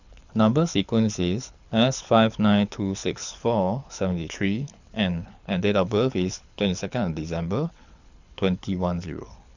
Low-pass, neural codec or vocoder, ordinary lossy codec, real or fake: 7.2 kHz; codec, 24 kHz, 6 kbps, HILCodec; none; fake